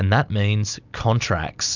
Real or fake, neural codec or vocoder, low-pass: real; none; 7.2 kHz